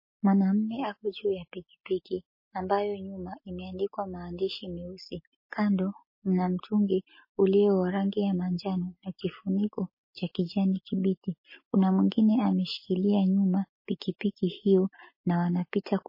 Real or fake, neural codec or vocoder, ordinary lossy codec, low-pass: real; none; MP3, 24 kbps; 5.4 kHz